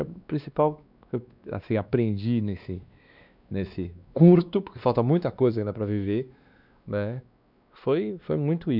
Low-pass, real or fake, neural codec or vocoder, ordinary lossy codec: 5.4 kHz; fake; codec, 16 kHz, 2 kbps, X-Codec, WavLM features, trained on Multilingual LibriSpeech; none